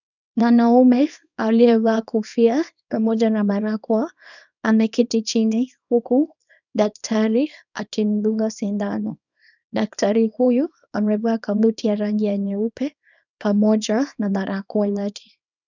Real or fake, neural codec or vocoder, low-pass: fake; codec, 24 kHz, 0.9 kbps, WavTokenizer, small release; 7.2 kHz